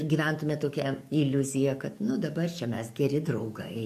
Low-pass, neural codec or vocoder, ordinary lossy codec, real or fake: 14.4 kHz; codec, 44.1 kHz, 7.8 kbps, DAC; MP3, 64 kbps; fake